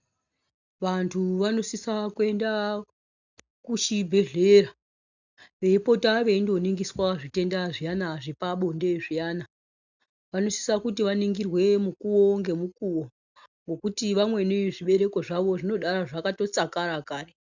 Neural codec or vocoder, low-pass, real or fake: none; 7.2 kHz; real